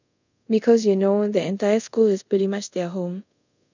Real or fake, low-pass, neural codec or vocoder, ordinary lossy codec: fake; 7.2 kHz; codec, 24 kHz, 0.5 kbps, DualCodec; none